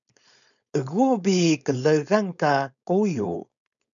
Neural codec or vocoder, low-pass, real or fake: codec, 16 kHz, 4.8 kbps, FACodec; 7.2 kHz; fake